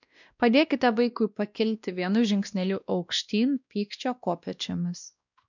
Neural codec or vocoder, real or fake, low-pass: codec, 16 kHz, 1 kbps, X-Codec, WavLM features, trained on Multilingual LibriSpeech; fake; 7.2 kHz